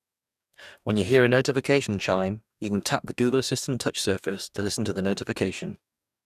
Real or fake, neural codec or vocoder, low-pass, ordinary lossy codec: fake; codec, 44.1 kHz, 2.6 kbps, DAC; 14.4 kHz; none